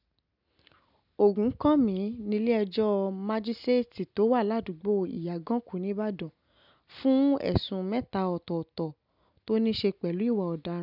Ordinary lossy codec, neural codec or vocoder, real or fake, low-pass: none; none; real; 5.4 kHz